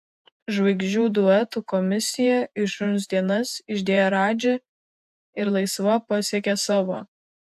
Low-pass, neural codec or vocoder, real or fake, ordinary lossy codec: 14.4 kHz; vocoder, 48 kHz, 128 mel bands, Vocos; fake; AAC, 96 kbps